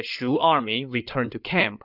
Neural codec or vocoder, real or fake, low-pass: codec, 16 kHz in and 24 kHz out, 2.2 kbps, FireRedTTS-2 codec; fake; 5.4 kHz